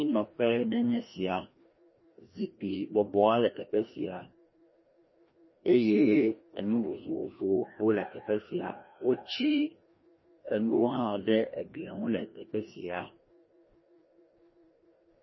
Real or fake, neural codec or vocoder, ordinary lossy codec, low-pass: fake; codec, 16 kHz, 1 kbps, FreqCodec, larger model; MP3, 24 kbps; 7.2 kHz